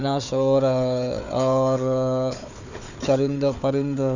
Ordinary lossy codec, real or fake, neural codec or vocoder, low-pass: none; fake; codec, 16 kHz, 4 kbps, FunCodec, trained on Chinese and English, 50 frames a second; 7.2 kHz